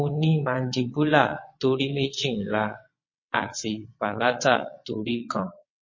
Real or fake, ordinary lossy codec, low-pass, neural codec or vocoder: fake; MP3, 32 kbps; 7.2 kHz; vocoder, 22.05 kHz, 80 mel bands, WaveNeXt